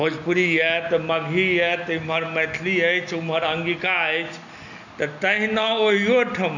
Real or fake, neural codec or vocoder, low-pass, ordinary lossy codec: real; none; 7.2 kHz; none